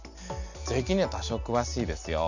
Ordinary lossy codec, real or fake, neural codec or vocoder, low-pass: none; real; none; 7.2 kHz